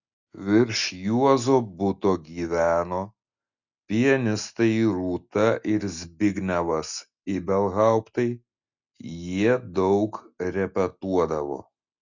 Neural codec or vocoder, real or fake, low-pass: none; real; 7.2 kHz